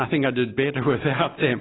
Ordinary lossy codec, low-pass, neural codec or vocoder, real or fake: AAC, 16 kbps; 7.2 kHz; none; real